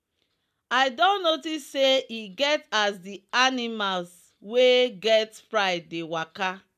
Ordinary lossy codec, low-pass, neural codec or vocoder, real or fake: none; 14.4 kHz; none; real